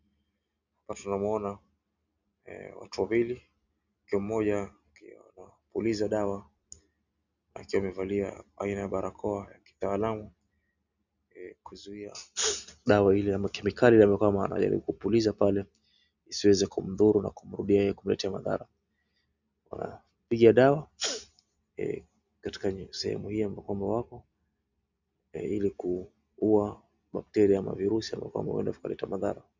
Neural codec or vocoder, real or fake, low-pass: none; real; 7.2 kHz